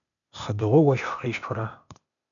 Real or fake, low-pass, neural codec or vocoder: fake; 7.2 kHz; codec, 16 kHz, 0.8 kbps, ZipCodec